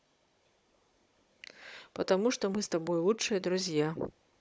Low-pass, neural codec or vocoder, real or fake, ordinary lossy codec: none; codec, 16 kHz, 16 kbps, FunCodec, trained on Chinese and English, 50 frames a second; fake; none